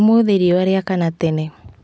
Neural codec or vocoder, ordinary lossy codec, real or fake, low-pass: none; none; real; none